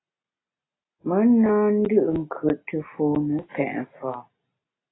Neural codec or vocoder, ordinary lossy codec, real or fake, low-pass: none; AAC, 16 kbps; real; 7.2 kHz